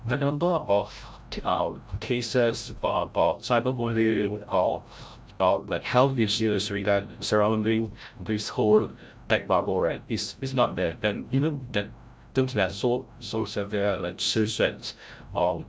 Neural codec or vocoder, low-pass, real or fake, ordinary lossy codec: codec, 16 kHz, 0.5 kbps, FreqCodec, larger model; none; fake; none